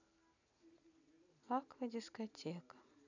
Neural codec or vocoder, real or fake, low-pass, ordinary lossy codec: none; real; 7.2 kHz; none